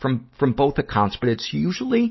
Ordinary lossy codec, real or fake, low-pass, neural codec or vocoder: MP3, 24 kbps; real; 7.2 kHz; none